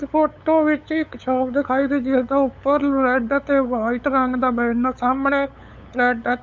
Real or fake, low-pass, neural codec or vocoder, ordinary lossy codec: fake; none; codec, 16 kHz, 8 kbps, FunCodec, trained on LibriTTS, 25 frames a second; none